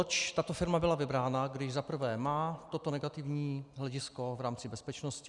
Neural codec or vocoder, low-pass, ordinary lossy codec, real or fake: none; 10.8 kHz; Opus, 64 kbps; real